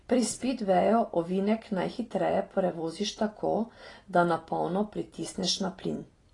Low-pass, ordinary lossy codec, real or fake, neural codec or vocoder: 10.8 kHz; AAC, 32 kbps; real; none